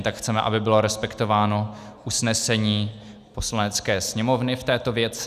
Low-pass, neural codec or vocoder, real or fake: 14.4 kHz; none; real